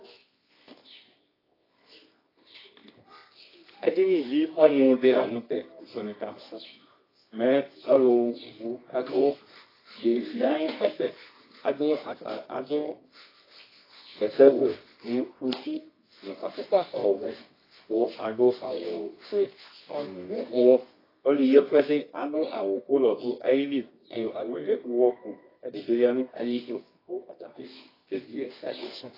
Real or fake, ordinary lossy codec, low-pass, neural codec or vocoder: fake; AAC, 24 kbps; 5.4 kHz; codec, 24 kHz, 0.9 kbps, WavTokenizer, medium music audio release